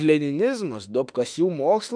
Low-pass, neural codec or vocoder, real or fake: 9.9 kHz; autoencoder, 48 kHz, 32 numbers a frame, DAC-VAE, trained on Japanese speech; fake